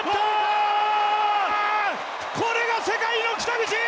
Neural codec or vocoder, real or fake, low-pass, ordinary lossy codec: none; real; none; none